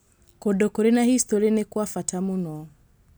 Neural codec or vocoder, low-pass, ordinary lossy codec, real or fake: none; none; none; real